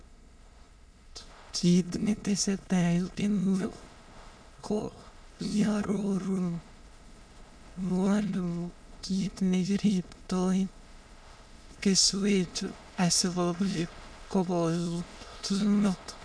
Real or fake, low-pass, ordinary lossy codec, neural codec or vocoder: fake; none; none; autoencoder, 22.05 kHz, a latent of 192 numbers a frame, VITS, trained on many speakers